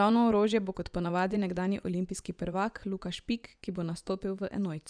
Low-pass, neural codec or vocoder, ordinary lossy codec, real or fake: 9.9 kHz; vocoder, 24 kHz, 100 mel bands, Vocos; none; fake